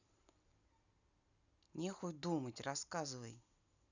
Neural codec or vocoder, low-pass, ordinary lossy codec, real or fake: none; 7.2 kHz; none; real